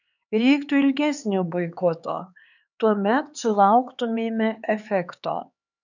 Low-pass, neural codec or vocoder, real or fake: 7.2 kHz; codec, 16 kHz, 4 kbps, X-Codec, HuBERT features, trained on LibriSpeech; fake